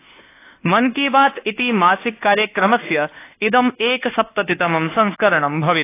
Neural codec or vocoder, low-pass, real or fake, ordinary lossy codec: codec, 24 kHz, 1.2 kbps, DualCodec; 3.6 kHz; fake; AAC, 24 kbps